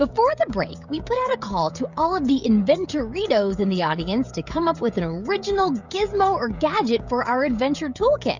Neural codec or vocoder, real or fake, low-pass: codec, 16 kHz, 16 kbps, FreqCodec, smaller model; fake; 7.2 kHz